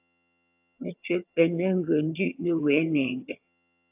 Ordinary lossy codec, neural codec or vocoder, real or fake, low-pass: AAC, 32 kbps; vocoder, 22.05 kHz, 80 mel bands, HiFi-GAN; fake; 3.6 kHz